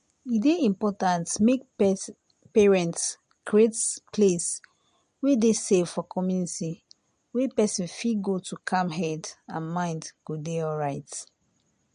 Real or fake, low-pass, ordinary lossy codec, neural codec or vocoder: real; 9.9 kHz; MP3, 48 kbps; none